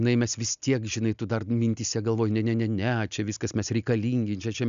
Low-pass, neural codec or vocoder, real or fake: 7.2 kHz; none; real